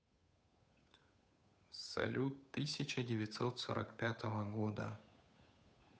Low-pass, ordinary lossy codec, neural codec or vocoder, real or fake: none; none; codec, 16 kHz, 8 kbps, FunCodec, trained on Chinese and English, 25 frames a second; fake